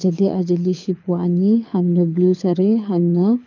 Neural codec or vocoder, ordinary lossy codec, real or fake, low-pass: codec, 24 kHz, 6 kbps, HILCodec; none; fake; 7.2 kHz